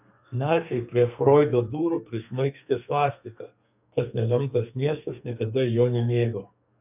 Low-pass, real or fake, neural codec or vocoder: 3.6 kHz; fake; codec, 32 kHz, 1.9 kbps, SNAC